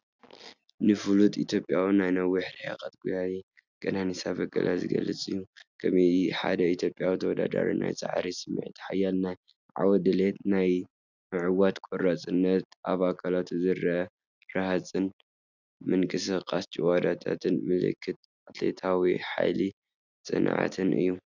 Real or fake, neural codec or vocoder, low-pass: real; none; 7.2 kHz